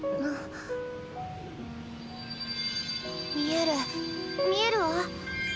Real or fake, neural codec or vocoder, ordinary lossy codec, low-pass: real; none; none; none